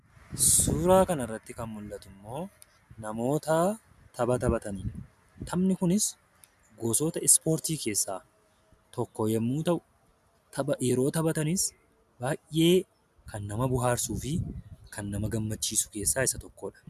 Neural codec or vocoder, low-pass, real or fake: none; 14.4 kHz; real